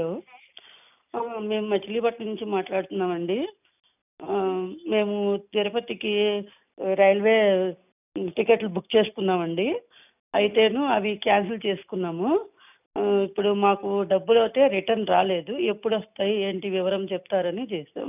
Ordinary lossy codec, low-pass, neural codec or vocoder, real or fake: none; 3.6 kHz; none; real